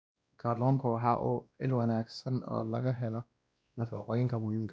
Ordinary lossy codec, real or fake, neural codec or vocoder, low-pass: none; fake; codec, 16 kHz, 1 kbps, X-Codec, WavLM features, trained on Multilingual LibriSpeech; none